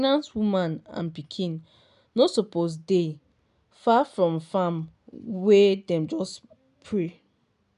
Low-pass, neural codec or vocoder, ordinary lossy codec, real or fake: 10.8 kHz; none; none; real